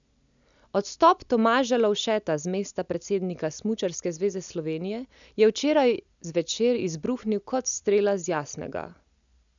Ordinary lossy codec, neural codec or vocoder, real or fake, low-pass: none; none; real; 7.2 kHz